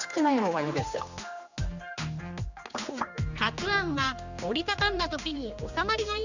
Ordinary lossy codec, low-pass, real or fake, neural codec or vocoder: none; 7.2 kHz; fake; codec, 16 kHz, 2 kbps, X-Codec, HuBERT features, trained on general audio